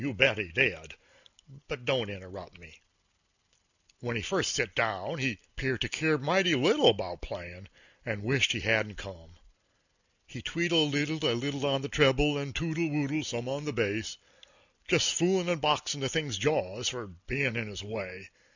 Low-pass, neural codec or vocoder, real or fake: 7.2 kHz; none; real